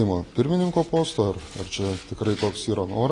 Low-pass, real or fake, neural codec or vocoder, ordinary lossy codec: 10.8 kHz; real; none; AAC, 32 kbps